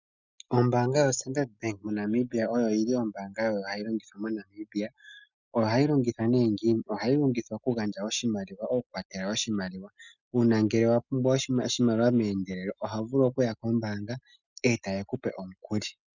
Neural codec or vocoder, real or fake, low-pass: none; real; 7.2 kHz